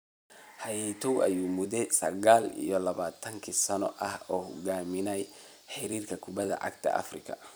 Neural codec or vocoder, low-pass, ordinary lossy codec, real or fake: vocoder, 44.1 kHz, 128 mel bands every 256 samples, BigVGAN v2; none; none; fake